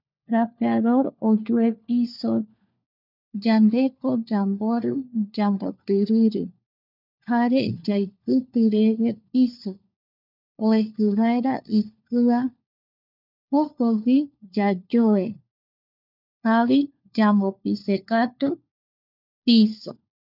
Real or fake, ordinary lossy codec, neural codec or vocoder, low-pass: fake; none; codec, 16 kHz, 4 kbps, FunCodec, trained on LibriTTS, 50 frames a second; 5.4 kHz